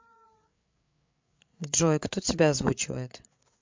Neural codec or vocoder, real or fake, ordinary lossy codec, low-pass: codec, 16 kHz, 8 kbps, FreqCodec, larger model; fake; MP3, 64 kbps; 7.2 kHz